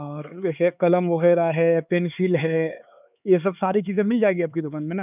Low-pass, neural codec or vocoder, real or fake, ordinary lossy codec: 3.6 kHz; codec, 16 kHz, 4 kbps, X-Codec, HuBERT features, trained on LibriSpeech; fake; none